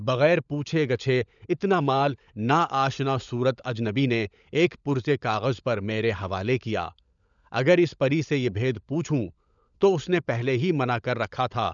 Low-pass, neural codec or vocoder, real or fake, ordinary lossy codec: 7.2 kHz; codec, 16 kHz, 16 kbps, FunCodec, trained on LibriTTS, 50 frames a second; fake; none